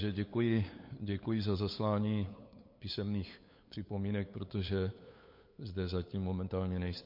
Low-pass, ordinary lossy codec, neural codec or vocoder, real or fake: 5.4 kHz; MP3, 32 kbps; codec, 16 kHz, 8 kbps, FunCodec, trained on LibriTTS, 25 frames a second; fake